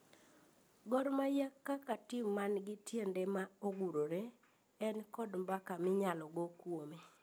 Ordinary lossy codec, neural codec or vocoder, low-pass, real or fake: none; none; none; real